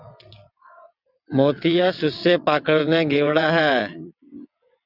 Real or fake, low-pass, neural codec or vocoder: fake; 5.4 kHz; vocoder, 22.05 kHz, 80 mel bands, WaveNeXt